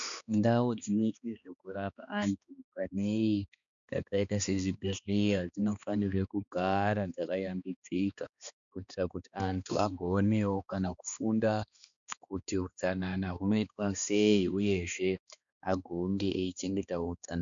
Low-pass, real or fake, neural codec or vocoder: 7.2 kHz; fake; codec, 16 kHz, 2 kbps, X-Codec, HuBERT features, trained on balanced general audio